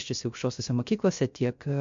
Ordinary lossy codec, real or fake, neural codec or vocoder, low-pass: MP3, 48 kbps; fake; codec, 16 kHz, 0.3 kbps, FocalCodec; 7.2 kHz